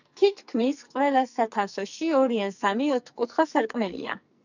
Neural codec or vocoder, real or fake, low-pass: codec, 44.1 kHz, 2.6 kbps, SNAC; fake; 7.2 kHz